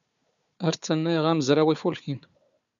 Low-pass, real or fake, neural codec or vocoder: 7.2 kHz; fake; codec, 16 kHz, 4 kbps, FunCodec, trained on Chinese and English, 50 frames a second